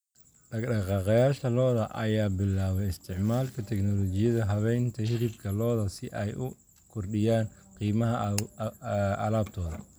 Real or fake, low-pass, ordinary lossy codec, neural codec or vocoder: real; none; none; none